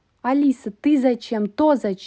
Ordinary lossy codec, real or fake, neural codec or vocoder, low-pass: none; real; none; none